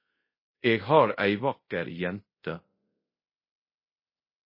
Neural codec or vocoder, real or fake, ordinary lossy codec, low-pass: codec, 24 kHz, 0.5 kbps, DualCodec; fake; MP3, 24 kbps; 5.4 kHz